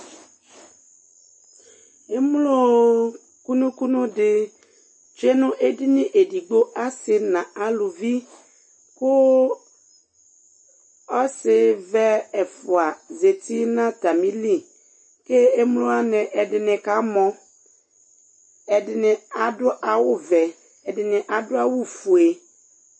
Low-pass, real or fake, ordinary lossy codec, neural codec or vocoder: 9.9 kHz; real; MP3, 32 kbps; none